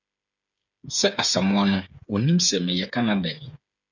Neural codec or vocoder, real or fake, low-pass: codec, 16 kHz, 8 kbps, FreqCodec, smaller model; fake; 7.2 kHz